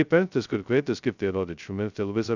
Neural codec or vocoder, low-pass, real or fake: codec, 16 kHz, 0.2 kbps, FocalCodec; 7.2 kHz; fake